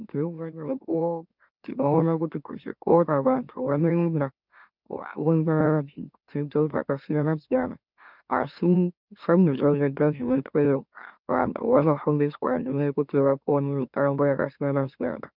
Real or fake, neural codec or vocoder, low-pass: fake; autoencoder, 44.1 kHz, a latent of 192 numbers a frame, MeloTTS; 5.4 kHz